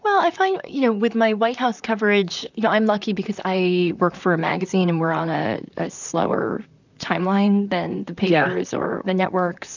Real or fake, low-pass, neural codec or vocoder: fake; 7.2 kHz; vocoder, 44.1 kHz, 128 mel bands, Pupu-Vocoder